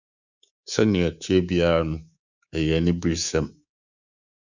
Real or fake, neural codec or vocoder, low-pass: fake; codec, 24 kHz, 3.1 kbps, DualCodec; 7.2 kHz